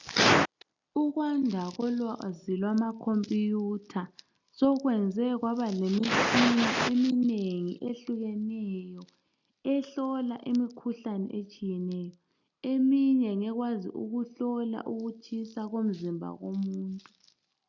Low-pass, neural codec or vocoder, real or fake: 7.2 kHz; none; real